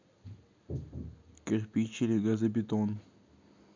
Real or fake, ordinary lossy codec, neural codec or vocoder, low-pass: real; MP3, 64 kbps; none; 7.2 kHz